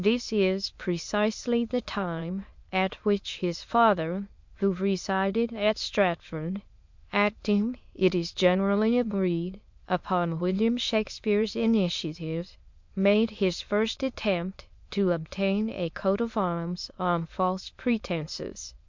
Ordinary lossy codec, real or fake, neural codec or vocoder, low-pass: MP3, 64 kbps; fake; autoencoder, 22.05 kHz, a latent of 192 numbers a frame, VITS, trained on many speakers; 7.2 kHz